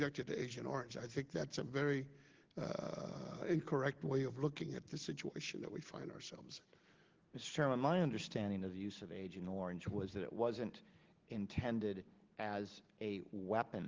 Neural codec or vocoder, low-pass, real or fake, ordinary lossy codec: none; 7.2 kHz; real; Opus, 16 kbps